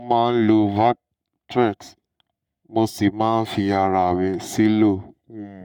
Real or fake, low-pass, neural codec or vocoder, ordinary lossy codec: fake; 19.8 kHz; codec, 44.1 kHz, 7.8 kbps, Pupu-Codec; none